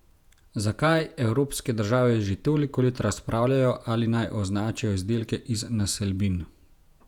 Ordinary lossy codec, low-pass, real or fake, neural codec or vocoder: none; 19.8 kHz; fake; vocoder, 48 kHz, 128 mel bands, Vocos